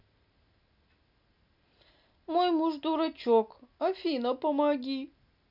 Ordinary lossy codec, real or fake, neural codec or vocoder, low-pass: none; real; none; 5.4 kHz